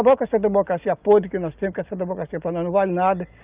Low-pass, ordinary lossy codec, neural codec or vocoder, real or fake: 3.6 kHz; Opus, 24 kbps; none; real